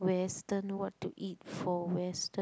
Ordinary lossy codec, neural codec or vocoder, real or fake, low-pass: none; none; real; none